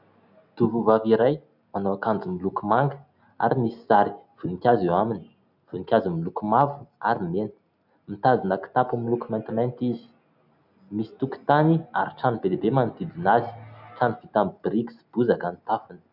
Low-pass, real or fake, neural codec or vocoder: 5.4 kHz; real; none